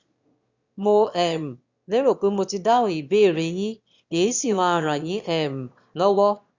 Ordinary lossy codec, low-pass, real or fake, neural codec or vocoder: Opus, 64 kbps; 7.2 kHz; fake; autoencoder, 22.05 kHz, a latent of 192 numbers a frame, VITS, trained on one speaker